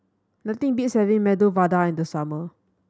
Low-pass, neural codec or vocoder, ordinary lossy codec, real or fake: none; none; none; real